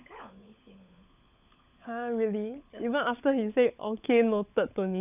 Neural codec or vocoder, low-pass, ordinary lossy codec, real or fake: codec, 16 kHz, 16 kbps, FunCodec, trained on LibriTTS, 50 frames a second; 3.6 kHz; none; fake